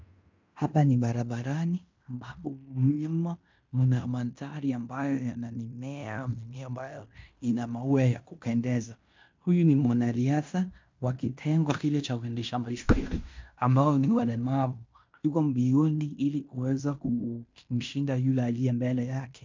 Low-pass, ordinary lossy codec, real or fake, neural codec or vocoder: 7.2 kHz; MP3, 64 kbps; fake; codec, 16 kHz in and 24 kHz out, 0.9 kbps, LongCat-Audio-Codec, fine tuned four codebook decoder